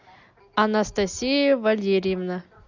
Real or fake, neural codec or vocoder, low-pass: real; none; 7.2 kHz